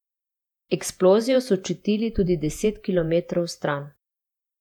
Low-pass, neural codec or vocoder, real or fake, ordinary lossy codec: 19.8 kHz; vocoder, 48 kHz, 128 mel bands, Vocos; fake; none